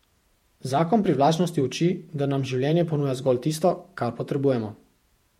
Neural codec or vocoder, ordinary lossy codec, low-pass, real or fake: vocoder, 48 kHz, 128 mel bands, Vocos; MP3, 64 kbps; 19.8 kHz; fake